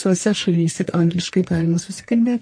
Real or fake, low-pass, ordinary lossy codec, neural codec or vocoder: fake; 9.9 kHz; MP3, 48 kbps; codec, 44.1 kHz, 1.7 kbps, Pupu-Codec